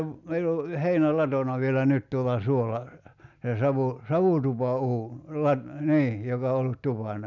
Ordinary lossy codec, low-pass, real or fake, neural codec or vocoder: none; 7.2 kHz; real; none